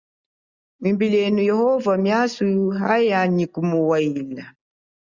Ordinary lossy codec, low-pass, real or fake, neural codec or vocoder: Opus, 64 kbps; 7.2 kHz; real; none